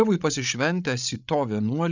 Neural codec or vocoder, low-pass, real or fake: codec, 16 kHz, 16 kbps, FreqCodec, larger model; 7.2 kHz; fake